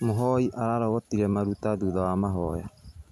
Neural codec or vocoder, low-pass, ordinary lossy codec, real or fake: none; 14.4 kHz; none; real